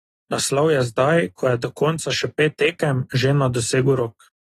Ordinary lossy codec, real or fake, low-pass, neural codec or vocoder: AAC, 32 kbps; real; 19.8 kHz; none